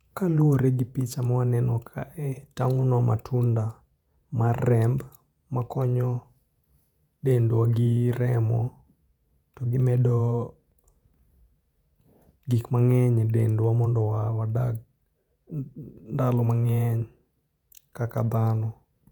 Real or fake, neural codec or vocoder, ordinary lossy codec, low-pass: fake; vocoder, 48 kHz, 128 mel bands, Vocos; none; 19.8 kHz